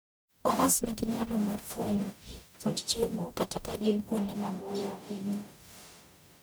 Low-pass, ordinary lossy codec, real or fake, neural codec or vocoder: none; none; fake; codec, 44.1 kHz, 0.9 kbps, DAC